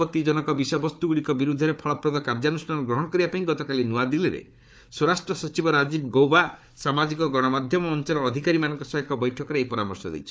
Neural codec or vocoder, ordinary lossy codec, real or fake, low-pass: codec, 16 kHz, 4 kbps, FunCodec, trained on Chinese and English, 50 frames a second; none; fake; none